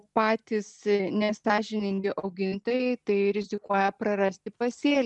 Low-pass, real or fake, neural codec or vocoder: 10.8 kHz; real; none